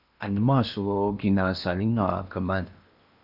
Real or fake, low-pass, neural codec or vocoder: fake; 5.4 kHz; codec, 16 kHz in and 24 kHz out, 0.8 kbps, FocalCodec, streaming, 65536 codes